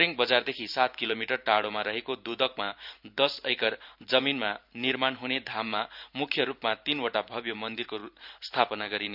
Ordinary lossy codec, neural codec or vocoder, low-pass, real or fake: none; vocoder, 44.1 kHz, 128 mel bands every 512 samples, BigVGAN v2; 5.4 kHz; fake